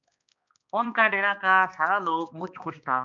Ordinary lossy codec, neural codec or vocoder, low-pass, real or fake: MP3, 64 kbps; codec, 16 kHz, 2 kbps, X-Codec, HuBERT features, trained on balanced general audio; 7.2 kHz; fake